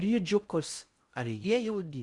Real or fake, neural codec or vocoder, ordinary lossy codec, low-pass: fake; codec, 16 kHz in and 24 kHz out, 0.6 kbps, FocalCodec, streaming, 4096 codes; Opus, 64 kbps; 10.8 kHz